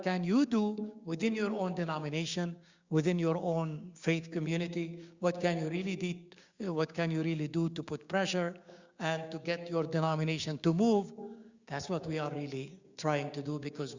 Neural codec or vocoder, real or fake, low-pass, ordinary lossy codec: codec, 16 kHz, 6 kbps, DAC; fake; 7.2 kHz; Opus, 64 kbps